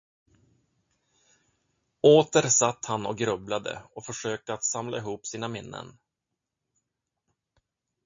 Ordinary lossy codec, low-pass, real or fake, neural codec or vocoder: MP3, 48 kbps; 7.2 kHz; real; none